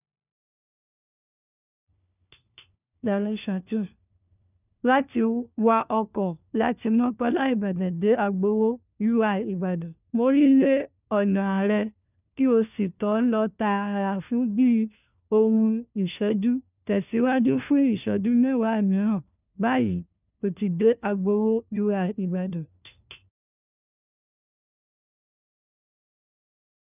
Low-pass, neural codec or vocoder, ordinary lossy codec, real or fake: 3.6 kHz; codec, 16 kHz, 1 kbps, FunCodec, trained on LibriTTS, 50 frames a second; none; fake